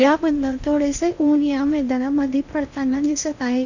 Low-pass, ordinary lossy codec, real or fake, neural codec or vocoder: 7.2 kHz; none; fake; codec, 16 kHz in and 24 kHz out, 0.8 kbps, FocalCodec, streaming, 65536 codes